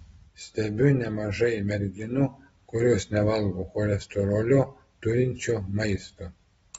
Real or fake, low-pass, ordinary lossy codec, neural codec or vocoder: real; 19.8 kHz; AAC, 24 kbps; none